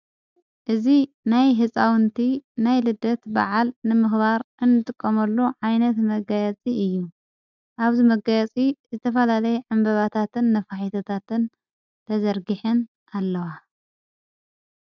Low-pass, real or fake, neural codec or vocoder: 7.2 kHz; real; none